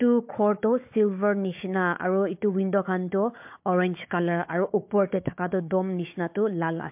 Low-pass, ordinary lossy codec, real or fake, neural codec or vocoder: 3.6 kHz; MP3, 32 kbps; fake; codec, 16 kHz, 4 kbps, FunCodec, trained on Chinese and English, 50 frames a second